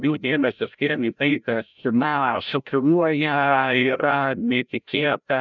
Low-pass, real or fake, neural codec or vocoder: 7.2 kHz; fake; codec, 16 kHz, 0.5 kbps, FreqCodec, larger model